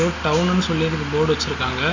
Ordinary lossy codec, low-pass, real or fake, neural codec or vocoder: Opus, 64 kbps; 7.2 kHz; real; none